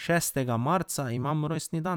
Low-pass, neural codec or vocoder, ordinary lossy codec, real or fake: none; vocoder, 44.1 kHz, 128 mel bands every 256 samples, BigVGAN v2; none; fake